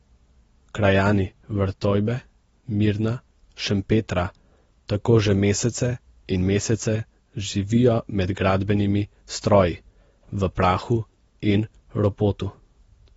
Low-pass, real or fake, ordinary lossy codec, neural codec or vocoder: 19.8 kHz; real; AAC, 24 kbps; none